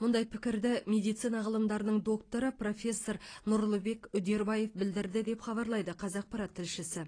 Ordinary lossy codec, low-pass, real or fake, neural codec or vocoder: AAC, 32 kbps; 9.9 kHz; fake; vocoder, 44.1 kHz, 128 mel bands every 256 samples, BigVGAN v2